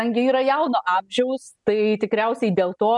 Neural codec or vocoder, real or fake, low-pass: none; real; 10.8 kHz